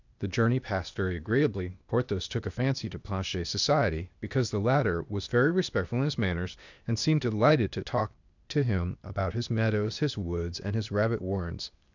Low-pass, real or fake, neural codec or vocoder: 7.2 kHz; fake; codec, 16 kHz, 0.8 kbps, ZipCodec